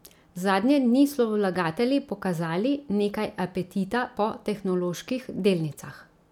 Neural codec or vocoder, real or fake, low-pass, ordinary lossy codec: none; real; 19.8 kHz; none